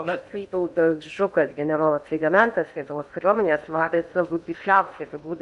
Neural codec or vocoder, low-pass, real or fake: codec, 16 kHz in and 24 kHz out, 0.8 kbps, FocalCodec, streaming, 65536 codes; 10.8 kHz; fake